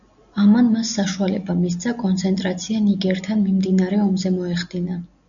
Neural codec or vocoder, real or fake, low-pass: none; real; 7.2 kHz